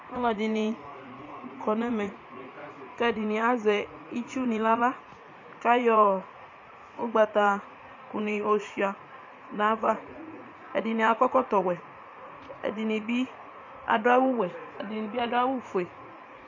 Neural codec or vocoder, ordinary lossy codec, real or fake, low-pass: vocoder, 44.1 kHz, 80 mel bands, Vocos; MP3, 48 kbps; fake; 7.2 kHz